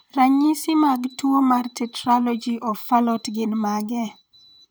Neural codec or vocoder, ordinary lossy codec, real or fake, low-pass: vocoder, 44.1 kHz, 128 mel bands, Pupu-Vocoder; none; fake; none